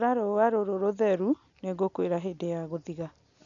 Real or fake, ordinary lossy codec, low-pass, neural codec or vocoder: real; none; 7.2 kHz; none